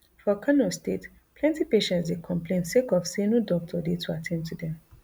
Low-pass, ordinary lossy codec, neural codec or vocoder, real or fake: none; none; none; real